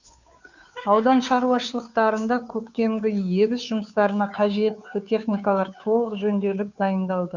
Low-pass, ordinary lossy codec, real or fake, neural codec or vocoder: 7.2 kHz; none; fake; codec, 16 kHz, 2 kbps, FunCodec, trained on Chinese and English, 25 frames a second